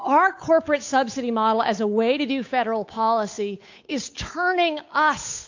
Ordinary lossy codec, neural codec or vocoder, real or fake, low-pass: AAC, 48 kbps; codec, 16 kHz, 8 kbps, FunCodec, trained on Chinese and English, 25 frames a second; fake; 7.2 kHz